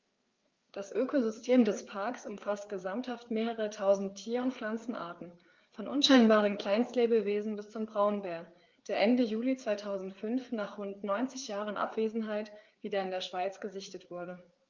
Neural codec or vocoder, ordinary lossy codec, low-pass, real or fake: codec, 16 kHz, 4 kbps, FreqCodec, larger model; Opus, 32 kbps; 7.2 kHz; fake